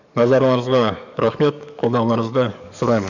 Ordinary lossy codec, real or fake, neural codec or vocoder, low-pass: none; fake; codec, 44.1 kHz, 7.8 kbps, DAC; 7.2 kHz